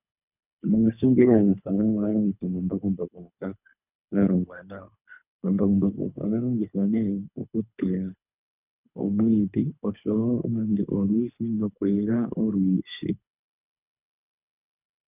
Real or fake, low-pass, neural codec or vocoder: fake; 3.6 kHz; codec, 24 kHz, 3 kbps, HILCodec